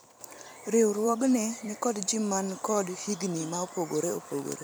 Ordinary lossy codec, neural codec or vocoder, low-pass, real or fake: none; vocoder, 44.1 kHz, 128 mel bands every 256 samples, BigVGAN v2; none; fake